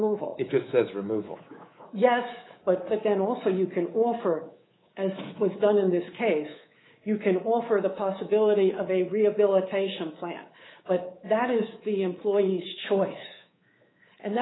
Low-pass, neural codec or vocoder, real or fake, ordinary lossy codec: 7.2 kHz; codec, 24 kHz, 3.1 kbps, DualCodec; fake; AAC, 16 kbps